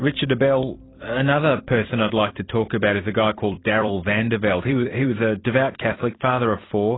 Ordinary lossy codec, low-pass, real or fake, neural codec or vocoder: AAC, 16 kbps; 7.2 kHz; fake; vocoder, 44.1 kHz, 128 mel bands every 256 samples, BigVGAN v2